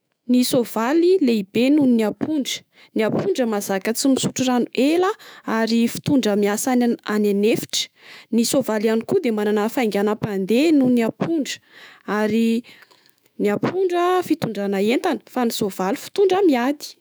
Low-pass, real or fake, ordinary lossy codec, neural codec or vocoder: none; fake; none; autoencoder, 48 kHz, 128 numbers a frame, DAC-VAE, trained on Japanese speech